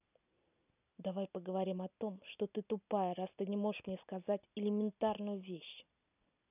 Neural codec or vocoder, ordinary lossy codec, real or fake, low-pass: none; none; real; 3.6 kHz